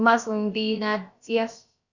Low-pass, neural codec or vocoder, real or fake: 7.2 kHz; codec, 16 kHz, about 1 kbps, DyCAST, with the encoder's durations; fake